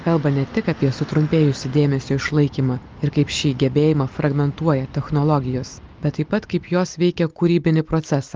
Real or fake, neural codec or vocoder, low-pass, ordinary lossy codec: real; none; 7.2 kHz; Opus, 32 kbps